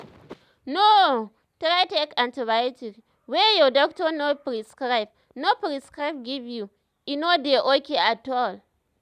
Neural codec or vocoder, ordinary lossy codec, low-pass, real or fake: none; none; 14.4 kHz; real